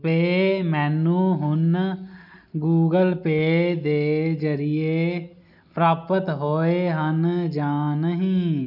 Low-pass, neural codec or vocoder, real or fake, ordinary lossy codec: 5.4 kHz; none; real; none